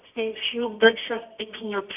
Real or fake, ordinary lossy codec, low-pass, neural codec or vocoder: fake; none; 3.6 kHz; codec, 24 kHz, 0.9 kbps, WavTokenizer, medium music audio release